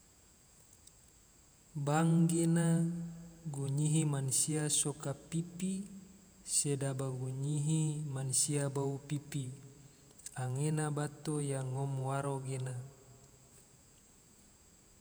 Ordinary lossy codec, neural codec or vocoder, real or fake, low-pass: none; vocoder, 48 kHz, 128 mel bands, Vocos; fake; none